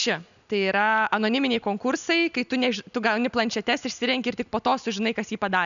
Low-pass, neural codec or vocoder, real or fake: 7.2 kHz; none; real